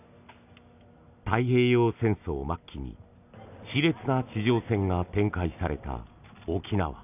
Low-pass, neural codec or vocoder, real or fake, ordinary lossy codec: 3.6 kHz; none; real; none